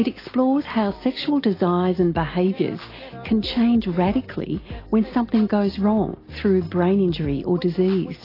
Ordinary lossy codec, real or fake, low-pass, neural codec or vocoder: AAC, 24 kbps; real; 5.4 kHz; none